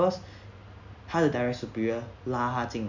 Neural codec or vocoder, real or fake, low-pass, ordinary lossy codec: none; real; 7.2 kHz; none